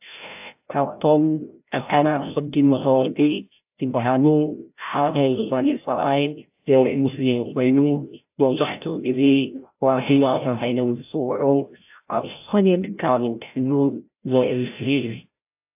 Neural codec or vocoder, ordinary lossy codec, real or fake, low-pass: codec, 16 kHz, 0.5 kbps, FreqCodec, larger model; AAC, 32 kbps; fake; 3.6 kHz